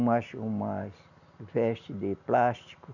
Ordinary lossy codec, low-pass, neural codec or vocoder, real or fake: none; 7.2 kHz; none; real